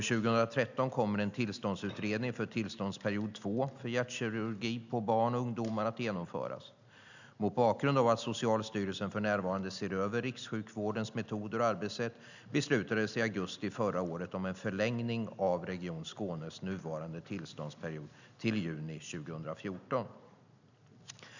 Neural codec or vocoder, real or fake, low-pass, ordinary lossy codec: none; real; 7.2 kHz; none